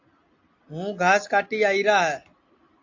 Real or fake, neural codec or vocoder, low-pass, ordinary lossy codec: real; none; 7.2 kHz; AAC, 48 kbps